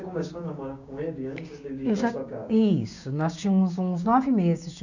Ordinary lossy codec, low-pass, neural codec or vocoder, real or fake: none; 7.2 kHz; codec, 16 kHz, 6 kbps, DAC; fake